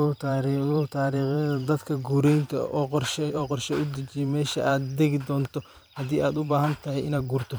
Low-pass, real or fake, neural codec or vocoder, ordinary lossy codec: none; fake; vocoder, 44.1 kHz, 128 mel bands every 512 samples, BigVGAN v2; none